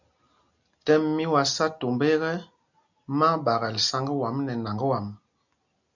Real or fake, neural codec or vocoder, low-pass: real; none; 7.2 kHz